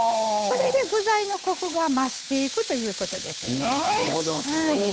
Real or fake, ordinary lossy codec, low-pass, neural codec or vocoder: fake; none; none; codec, 16 kHz, 8 kbps, FunCodec, trained on Chinese and English, 25 frames a second